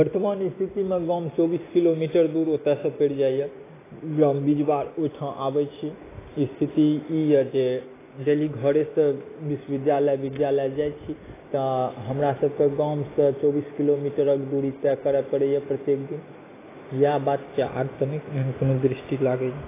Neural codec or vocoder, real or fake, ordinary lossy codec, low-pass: none; real; AAC, 16 kbps; 3.6 kHz